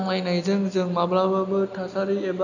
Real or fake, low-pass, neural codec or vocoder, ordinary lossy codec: real; 7.2 kHz; none; none